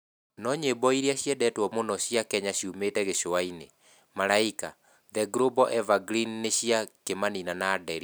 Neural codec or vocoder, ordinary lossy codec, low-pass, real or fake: none; none; none; real